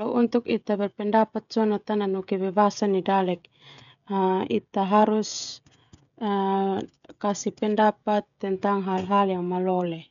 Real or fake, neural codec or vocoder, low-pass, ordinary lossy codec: fake; codec, 16 kHz, 16 kbps, FreqCodec, smaller model; 7.2 kHz; none